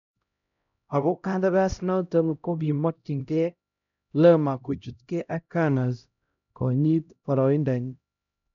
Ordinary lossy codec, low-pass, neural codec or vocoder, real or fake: none; 7.2 kHz; codec, 16 kHz, 0.5 kbps, X-Codec, HuBERT features, trained on LibriSpeech; fake